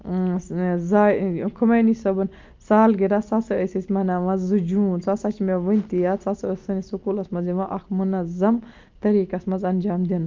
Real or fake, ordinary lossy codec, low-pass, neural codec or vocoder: real; Opus, 24 kbps; 7.2 kHz; none